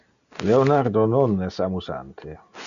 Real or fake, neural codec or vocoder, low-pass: real; none; 7.2 kHz